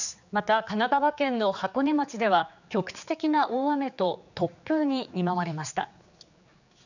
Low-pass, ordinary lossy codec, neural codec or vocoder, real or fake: 7.2 kHz; none; codec, 16 kHz, 4 kbps, X-Codec, HuBERT features, trained on general audio; fake